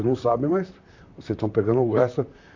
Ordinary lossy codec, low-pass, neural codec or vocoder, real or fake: AAC, 48 kbps; 7.2 kHz; vocoder, 44.1 kHz, 128 mel bands, Pupu-Vocoder; fake